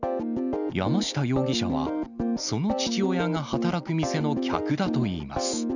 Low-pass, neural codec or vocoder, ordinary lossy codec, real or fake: 7.2 kHz; none; none; real